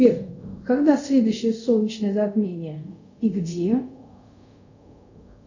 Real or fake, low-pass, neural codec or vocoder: fake; 7.2 kHz; codec, 24 kHz, 0.5 kbps, DualCodec